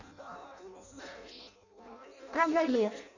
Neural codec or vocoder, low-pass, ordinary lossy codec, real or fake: codec, 16 kHz in and 24 kHz out, 0.6 kbps, FireRedTTS-2 codec; 7.2 kHz; none; fake